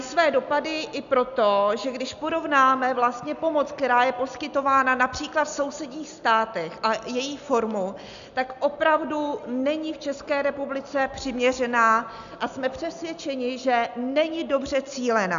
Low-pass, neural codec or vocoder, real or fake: 7.2 kHz; none; real